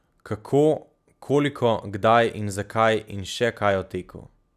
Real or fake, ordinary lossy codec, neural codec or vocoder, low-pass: real; none; none; 14.4 kHz